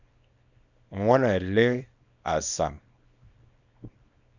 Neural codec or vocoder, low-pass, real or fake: codec, 24 kHz, 0.9 kbps, WavTokenizer, small release; 7.2 kHz; fake